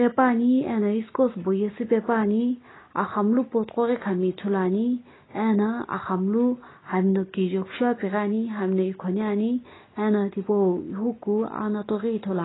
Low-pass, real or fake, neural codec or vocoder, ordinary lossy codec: 7.2 kHz; real; none; AAC, 16 kbps